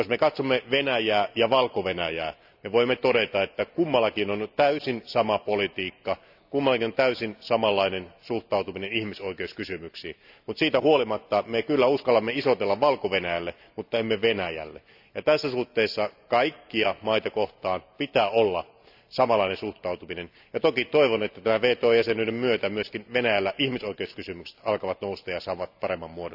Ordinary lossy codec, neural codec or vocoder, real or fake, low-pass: none; none; real; 5.4 kHz